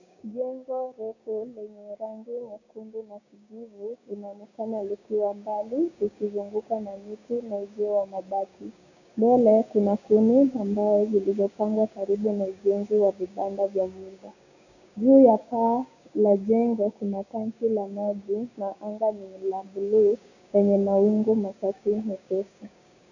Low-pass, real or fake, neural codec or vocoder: 7.2 kHz; fake; codec, 16 kHz, 6 kbps, DAC